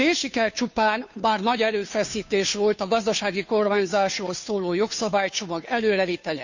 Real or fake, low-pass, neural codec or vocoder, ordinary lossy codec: fake; 7.2 kHz; codec, 16 kHz, 2 kbps, FunCodec, trained on Chinese and English, 25 frames a second; none